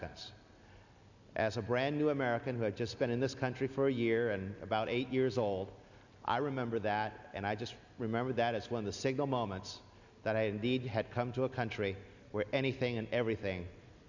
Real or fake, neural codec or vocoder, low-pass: real; none; 7.2 kHz